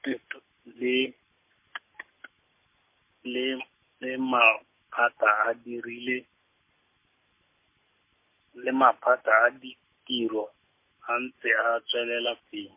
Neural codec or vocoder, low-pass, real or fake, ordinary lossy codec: none; 3.6 kHz; real; MP3, 24 kbps